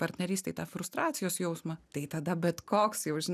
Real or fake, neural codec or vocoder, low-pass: real; none; 14.4 kHz